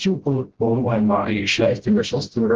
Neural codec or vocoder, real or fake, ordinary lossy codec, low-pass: codec, 16 kHz, 0.5 kbps, FreqCodec, smaller model; fake; Opus, 16 kbps; 7.2 kHz